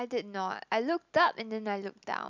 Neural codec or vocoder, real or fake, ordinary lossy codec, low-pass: none; real; none; 7.2 kHz